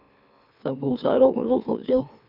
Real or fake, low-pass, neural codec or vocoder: fake; 5.4 kHz; autoencoder, 44.1 kHz, a latent of 192 numbers a frame, MeloTTS